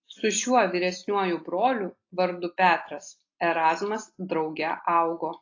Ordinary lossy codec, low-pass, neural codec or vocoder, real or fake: AAC, 32 kbps; 7.2 kHz; none; real